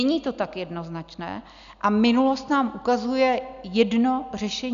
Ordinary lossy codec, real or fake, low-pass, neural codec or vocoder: MP3, 96 kbps; real; 7.2 kHz; none